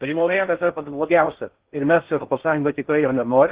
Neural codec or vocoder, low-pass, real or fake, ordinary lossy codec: codec, 16 kHz in and 24 kHz out, 0.6 kbps, FocalCodec, streaming, 4096 codes; 3.6 kHz; fake; Opus, 16 kbps